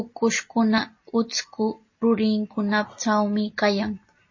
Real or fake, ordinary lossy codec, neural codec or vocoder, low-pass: real; MP3, 32 kbps; none; 7.2 kHz